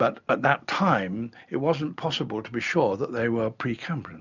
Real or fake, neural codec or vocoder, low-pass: real; none; 7.2 kHz